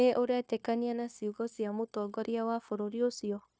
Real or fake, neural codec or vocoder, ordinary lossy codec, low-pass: fake; codec, 16 kHz, 0.9 kbps, LongCat-Audio-Codec; none; none